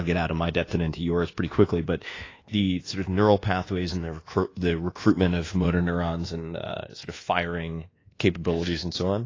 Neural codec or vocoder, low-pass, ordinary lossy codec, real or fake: codec, 24 kHz, 1.2 kbps, DualCodec; 7.2 kHz; AAC, 32 kbps; fake